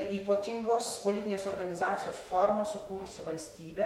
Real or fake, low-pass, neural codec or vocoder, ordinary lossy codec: fake; 19.8 kHz; autoencoder, 48 kHz, 32 numbers a frame, DAC-VAE, trained on Japanese speech; MP3, 64 kbps